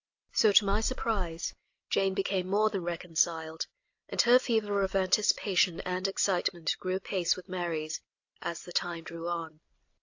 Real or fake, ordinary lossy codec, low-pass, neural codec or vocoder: real; AAC, 48 kbps; 7.2 kHz; none